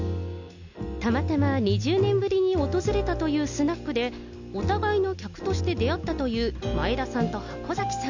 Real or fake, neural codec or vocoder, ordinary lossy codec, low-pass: real; none; none; 7.2 kHz